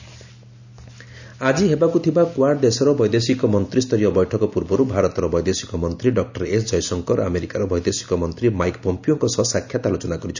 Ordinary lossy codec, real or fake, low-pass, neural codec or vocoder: none; real; 7.2 kHz; none